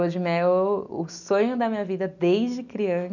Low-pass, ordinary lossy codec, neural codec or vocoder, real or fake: 7.2 kHz; none; none; real